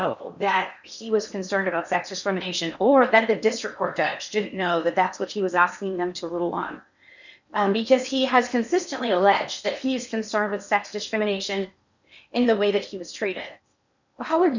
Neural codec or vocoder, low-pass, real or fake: codec, 16 kHz in and 24 kHz out, 0.8 kbps, FocalCodec, streaming, 65536 codes; 7.2 kHz; fake